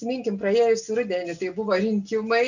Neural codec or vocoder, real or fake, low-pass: none; real; 7.2 kHz